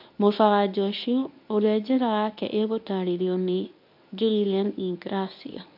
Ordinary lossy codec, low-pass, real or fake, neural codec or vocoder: MP3, 48 kbps; 5.4 kHz; fake; codec, 24 kHz, 0.9 kbps, WavTokenizer, medium speech release version 1